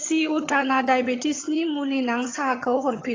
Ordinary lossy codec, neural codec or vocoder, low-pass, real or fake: AAC, 32 kbps; vocoder, 22.05 kHz, 80 mel bands, HiFi-GAN; 7.2 kHz; fake